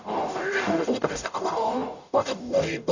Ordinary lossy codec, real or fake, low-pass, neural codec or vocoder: none; fake; 7.2 kHz; codec, 44.1 kHz, 0.9 kbps, DAC